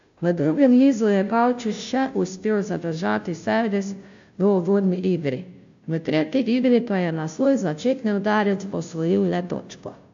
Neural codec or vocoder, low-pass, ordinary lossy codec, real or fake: codec, 16 kHz, 0.5 kbps, FunCodec, trained on Chinese and English, 25 frames a second; 7.2 kHz; AAC, 64 kbps; fake